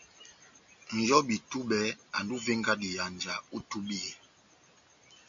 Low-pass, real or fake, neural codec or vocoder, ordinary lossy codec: 7.2 kHz; real; none; MP3, 64 kbps